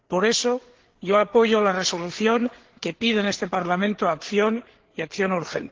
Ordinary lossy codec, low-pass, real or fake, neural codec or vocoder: Opus, 16 kbps; 7.2 kHz; fake; codec, 16 kHz, 4 kbps, FreqCodec, larger model